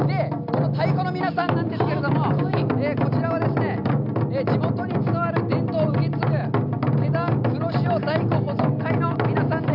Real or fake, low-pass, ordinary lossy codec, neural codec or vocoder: real; 5.4 kHz; none; none